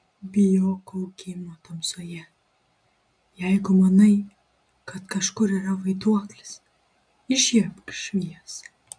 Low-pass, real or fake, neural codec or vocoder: 9.9 kHz; real; none